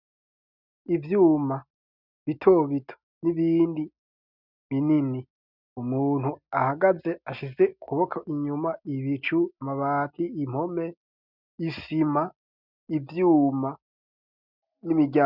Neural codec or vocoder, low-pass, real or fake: none; 5.4 kHz; real